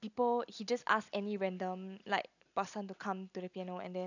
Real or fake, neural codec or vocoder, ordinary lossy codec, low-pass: real; none; none; 7.2 kHz